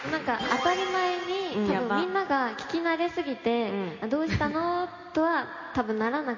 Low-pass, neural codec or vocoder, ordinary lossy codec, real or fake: 7.2 kHz; none; MP3, 32 kbps; real